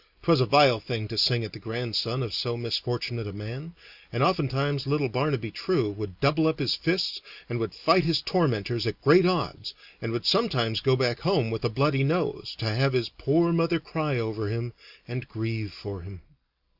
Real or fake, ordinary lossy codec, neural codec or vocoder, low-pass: real; Opus, 64 kbps; none; 5.4 kHz